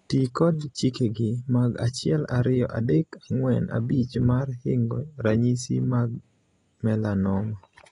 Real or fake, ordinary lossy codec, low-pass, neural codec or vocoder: real; AAC, 32 kbps; 10.8 kHz; none